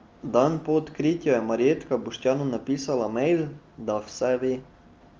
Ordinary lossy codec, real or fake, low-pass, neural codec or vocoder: Opus, 32 kbps; real; 7.2 kHz; none